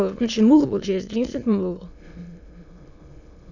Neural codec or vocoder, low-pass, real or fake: autoencoder, 22.05 kHz, a latent of 192 numbers a frame, VITS, trained on many speakers; 7.2 kHz; fake